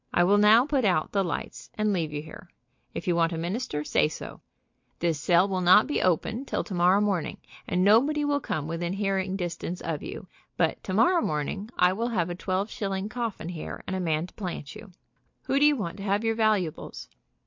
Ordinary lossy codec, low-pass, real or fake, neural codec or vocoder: MP3, 48 kbps; 7.2 kHz; real; none